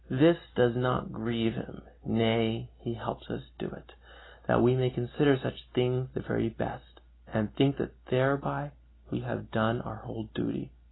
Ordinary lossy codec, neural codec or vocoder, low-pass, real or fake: AAC, 16 kbps; none; 7.2 kHz; real